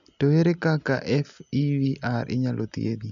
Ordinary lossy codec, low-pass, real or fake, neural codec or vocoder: none; 7.2 kHz; real; none